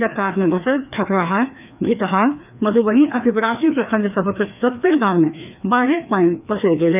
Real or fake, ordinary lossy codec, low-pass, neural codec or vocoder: fake; none; 3.6 kHz; codec, 16 kHz, 2 kbps, FreqCodec, larger model